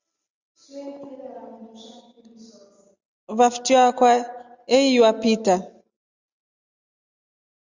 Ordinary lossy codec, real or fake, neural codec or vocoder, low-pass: Opus, 64 kbps; real; none; 7.2 kHz